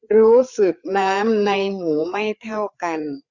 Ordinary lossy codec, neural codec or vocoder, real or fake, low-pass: Opus, 64 kbps; codec, 16 kHz, 4 kbps, FreqCodec, larger model; fake; 7.2 kHz